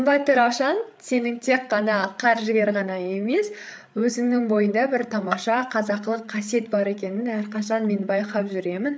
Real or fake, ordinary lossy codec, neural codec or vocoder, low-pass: fake; none; codec, 16 kHz, 8 kbps, FreqCodec, larger model; none